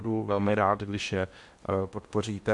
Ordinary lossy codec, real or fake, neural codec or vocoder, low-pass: MP3, 64 kbps; fake; codec, 16 kHz in and 24 kHz out, 0.8 kbps, FocalCodec, streaming, 65536 codes; 10.8 kHz